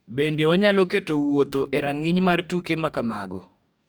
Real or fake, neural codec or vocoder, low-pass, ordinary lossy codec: fake; codec, 44.1 kHz, 2.6 kbps, DAC; none; none